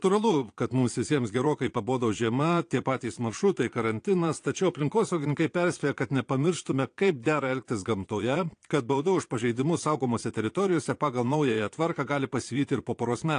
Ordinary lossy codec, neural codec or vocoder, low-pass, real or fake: AAC, 48 kbps; vocoder, 22.05 kHz, 80 mel bands, Vocos; 9.9 kHz; fake